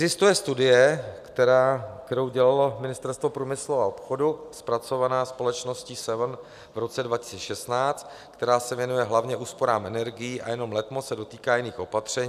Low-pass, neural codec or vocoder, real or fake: 14.4 kHz; none; real